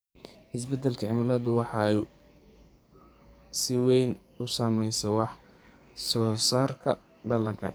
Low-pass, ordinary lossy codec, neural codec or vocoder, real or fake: none; none; codec, 44.1 kHz, 2.6 kbps, SNAC; fake